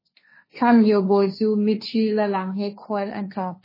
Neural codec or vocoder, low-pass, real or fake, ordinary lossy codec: codec, 16 kHz, 1.1 kbps, Voila-Tokenizer; 5.4 kHz; fake; MP3, 24 kbps